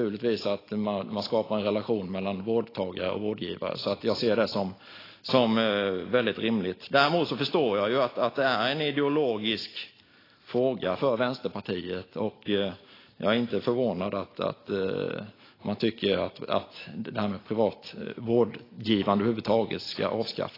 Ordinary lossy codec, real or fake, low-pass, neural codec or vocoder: AAC, 24 kbps; real; 5.4 kHz; none